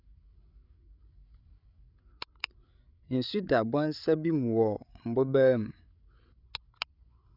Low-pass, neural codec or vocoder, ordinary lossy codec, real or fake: 5.4 kHz; codec, 16 kHz, 8 kbps, FreqCodec, larger model; none; fake